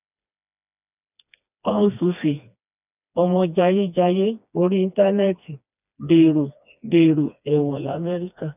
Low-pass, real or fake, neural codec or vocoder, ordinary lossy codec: 3.6 kHz; fake; codec, 16 kHz, 2 kbps, FreqCodec, smaller model; none